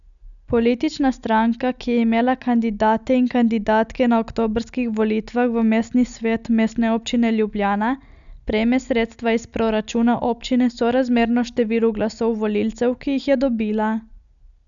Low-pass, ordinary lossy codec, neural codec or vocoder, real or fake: 7.2 kHz; none; none; real